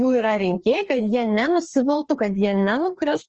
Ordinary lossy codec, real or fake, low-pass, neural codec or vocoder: Opus, 24 kbps; fake; 7.2 kHz; codec, 16 kHz, 2 kbps, FreqCodec, larger model